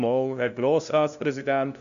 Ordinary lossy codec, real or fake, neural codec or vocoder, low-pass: none; fake; codec, 16 kHz, 0.5 kbps, FunCodec, trained on LibriTTS, 25 frames a second; 7.2 kHz